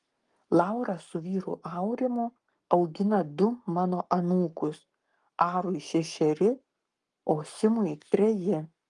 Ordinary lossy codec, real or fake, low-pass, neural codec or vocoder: Opus, 32 kbps; fake; 10.8 kHz; codec, 44.1 kHz, 7.8 kbps, Pupu-Codec